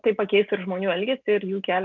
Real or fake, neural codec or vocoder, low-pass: real; none; 7.2 kHz